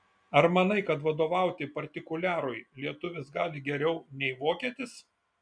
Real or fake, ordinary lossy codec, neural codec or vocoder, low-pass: real; MP3, 96 kbps; none; 9.9 kHz